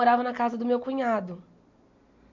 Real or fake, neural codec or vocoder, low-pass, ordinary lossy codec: real; none; 7.2 kHz; MP3, 48 kbps